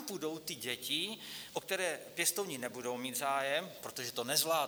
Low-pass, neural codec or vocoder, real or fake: 19.8 kHz; none; real